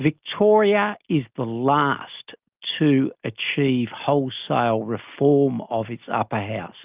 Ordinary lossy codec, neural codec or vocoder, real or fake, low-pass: Opus, 24 kbps; none; real; 3.6 kHz